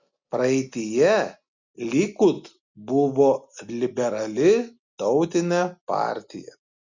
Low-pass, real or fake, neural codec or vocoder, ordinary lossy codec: 7.2 kHz; real; none; Opus, 64 kbps